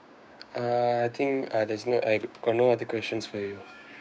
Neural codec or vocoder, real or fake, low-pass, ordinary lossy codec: codec, 16 kHz, 6 kbps, DAC; fake; none; none